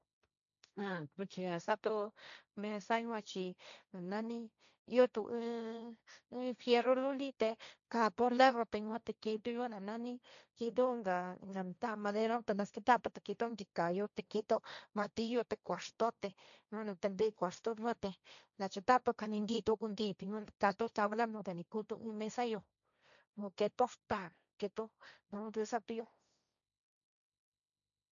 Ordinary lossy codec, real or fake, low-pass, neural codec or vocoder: none; fake; 7.2 kHz; codec, 16 kHz, 1.1 kbps, Voila-Tokenizer